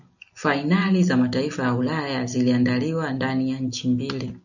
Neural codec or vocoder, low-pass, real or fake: none; 7.2 kHz; real